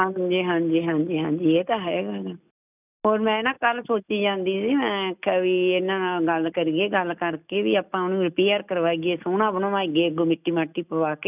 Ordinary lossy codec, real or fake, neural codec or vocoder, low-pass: none; real; none; 3.6 kHz